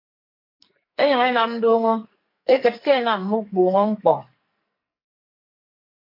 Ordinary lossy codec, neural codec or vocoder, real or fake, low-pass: MP3, 32 kbps; codec, 44.1 kHz, 2.6 kbps, SNAC; fake; 5.4 kHz